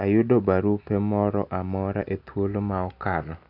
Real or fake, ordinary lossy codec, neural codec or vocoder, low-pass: real; none; none; 5.4 kHz